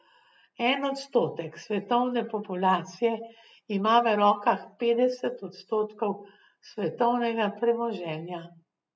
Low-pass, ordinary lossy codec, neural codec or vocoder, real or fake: none; none; none; real